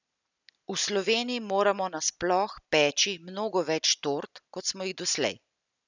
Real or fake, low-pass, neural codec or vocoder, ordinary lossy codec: real; 7.2 kHz; none; none